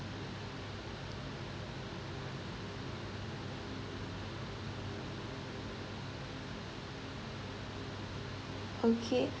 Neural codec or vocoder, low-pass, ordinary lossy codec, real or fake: none; none; none; real